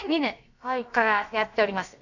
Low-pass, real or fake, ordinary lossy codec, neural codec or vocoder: 7.2 kHz; fake; none; codec, 16 kHz, about 1 kbps, DyCAST, with the encoder's durations